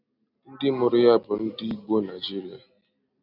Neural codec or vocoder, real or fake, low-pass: none; real; 5.4 kHz